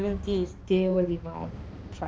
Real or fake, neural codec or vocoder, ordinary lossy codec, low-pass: fake; codec, 16 kHz, 2 kbps, X-Codec, HuBERT features, trained on balanced general audio; none; none